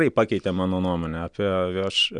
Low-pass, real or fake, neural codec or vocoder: 9.9 kHz; real; none